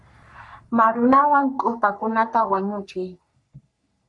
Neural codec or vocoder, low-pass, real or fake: codec, 44.1 kHz, 3.4 kbps, Pupu-Codec; 10.8 kHz; fake